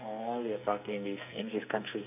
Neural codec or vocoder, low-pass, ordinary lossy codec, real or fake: codec, 44.1 kHz, 2.6 kbps, SNAC; 3.6 kHz; none; fake